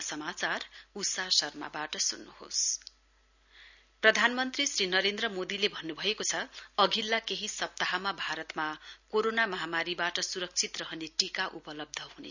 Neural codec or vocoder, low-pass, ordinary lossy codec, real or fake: none; 7.2 kHz; none; real